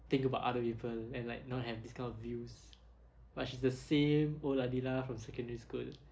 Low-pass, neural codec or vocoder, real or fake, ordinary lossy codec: none; none; real; none